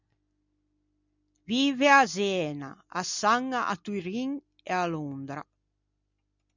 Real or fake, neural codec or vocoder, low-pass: real; none; 7.2 kHz